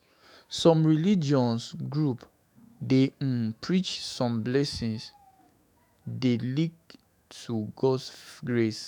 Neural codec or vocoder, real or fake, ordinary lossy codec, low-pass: autoencoder, 48 kHz, 128 numbers a frame, DAC-VAE, trained on Japanese speech; fake; none; 19.8 kHz